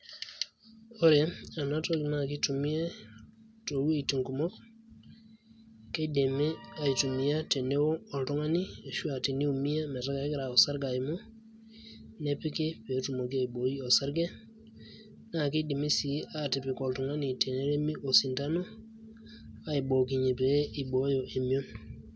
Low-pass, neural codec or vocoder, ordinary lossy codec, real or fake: none; none; none; real